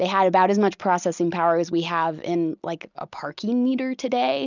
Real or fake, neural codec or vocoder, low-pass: real; none; 7.2 kHz